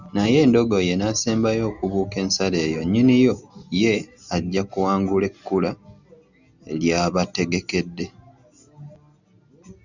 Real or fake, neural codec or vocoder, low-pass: real; none; 7.2 kHz